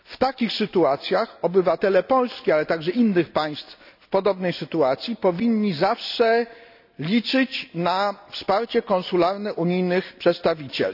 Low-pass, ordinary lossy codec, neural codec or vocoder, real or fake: 5.4 kHz; none; none; real